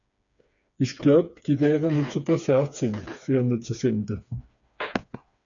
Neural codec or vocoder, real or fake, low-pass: codec, 16 kHz, 4 kbps, FreqCodec, smaller model; fake; 7.2 kHz